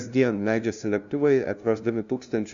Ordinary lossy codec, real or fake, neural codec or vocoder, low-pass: Opus, 64 kbps; fake; codec, 16 kHz, 0.5 kbps, FunCodec, trained on LibriTTS, 25 frames a second; 7.2 kHz